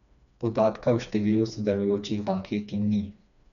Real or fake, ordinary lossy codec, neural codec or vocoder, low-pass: fake; none; codec, 16 kHz, 2 kbps, FreqCodec, smaller model; 7.2 kHz